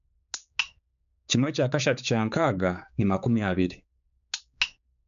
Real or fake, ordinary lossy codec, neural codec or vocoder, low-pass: fake; none; codec, 16 kHz, 4 kbps, X-Codec, HuBERT features, trained on general audio; 7.2 kHz